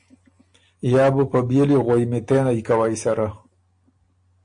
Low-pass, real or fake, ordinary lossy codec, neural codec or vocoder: 9.9 kHz; real; AAC, 64 kbps; none